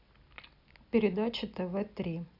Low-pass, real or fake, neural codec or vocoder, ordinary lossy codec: 5.4 kHz; real; none; none